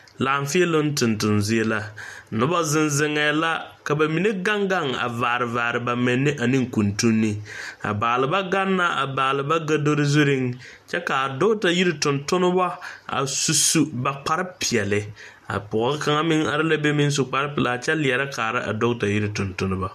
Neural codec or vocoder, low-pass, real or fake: none; 14.4 kHz; real